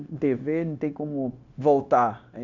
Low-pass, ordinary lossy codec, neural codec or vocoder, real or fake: 7.2 kHz; none; codec, 16 kHz, 0.9 kbps, LongCat-Audio-Codec; fake